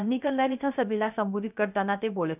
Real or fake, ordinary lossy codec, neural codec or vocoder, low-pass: fake; none; codec, 16 kHz, 0.3 kbps, FocalCodec; 3.6 kHz